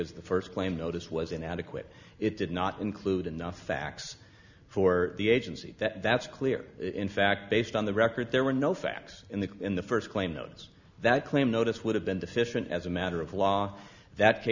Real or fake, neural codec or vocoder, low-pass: real; none; 7.2 kHz